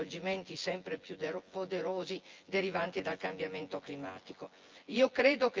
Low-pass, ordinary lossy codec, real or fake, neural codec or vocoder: 7.2 kHz; Opus, 24 kbps; fake; vocoder, 24 kHz, 100 mel bands, Vocos